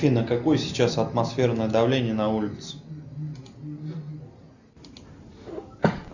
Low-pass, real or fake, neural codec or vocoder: 7.2 kHz; real; none